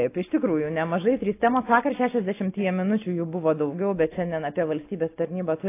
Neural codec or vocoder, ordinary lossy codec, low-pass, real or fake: none; AAC, 24 kbps; 3.6 kHz; real